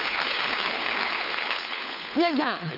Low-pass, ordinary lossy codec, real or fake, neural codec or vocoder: 5.4 kHz; none; fake; codec, 16 kHz, 4 kbps, FunCodec, trained on LibriTTS, 50 frames a second